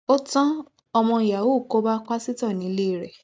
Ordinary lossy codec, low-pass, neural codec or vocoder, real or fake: none; none; none; real